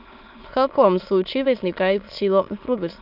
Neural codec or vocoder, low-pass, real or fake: autoencoder, 22.05 kHz, a latent of 192 numbers a frame, VITS, trained on many speakers; 5.4 kHz; fake